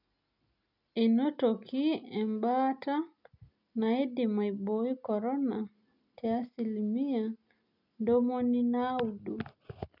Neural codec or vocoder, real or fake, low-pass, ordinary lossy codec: none; real; 5.4 kHz; none